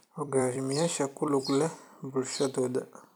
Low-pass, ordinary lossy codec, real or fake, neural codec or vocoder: none; none; fake; vocoder, 44.1 kHz, 128 mel bands every 256 samples, BigVGAN v2